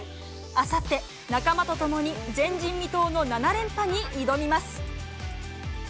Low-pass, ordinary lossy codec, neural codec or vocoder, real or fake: none; none; none; real